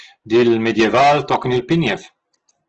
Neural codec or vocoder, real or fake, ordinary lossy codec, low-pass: none; real; Opus, 16 kbps; 7.2 kHz